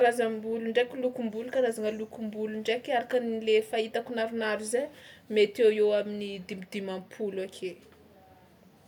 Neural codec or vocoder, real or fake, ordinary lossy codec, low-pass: none; real; none; 19.8 kHz